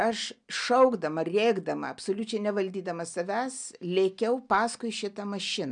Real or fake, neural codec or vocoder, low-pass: real; none; 9.9 kHz